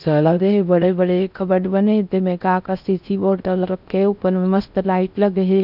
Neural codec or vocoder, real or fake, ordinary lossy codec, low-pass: codec, 16 kHz in and 24 kHz out, 0.6 kbps, FocalCodec, streaming, 4096 codes; fake; none; 5.4 kHz